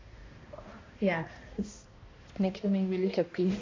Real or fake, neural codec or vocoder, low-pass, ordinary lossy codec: fake; codec, 16 kHz, 1 kbps, X-Codec, HuBERT features, trained on balanced general audio; 7.2 kHz; AAC, 32 kbps